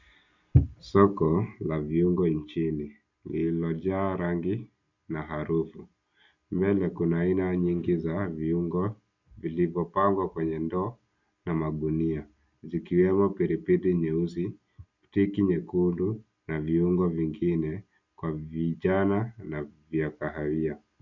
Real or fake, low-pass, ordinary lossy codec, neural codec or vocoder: real; 7.2 kHz; MP3, 64 kbps; none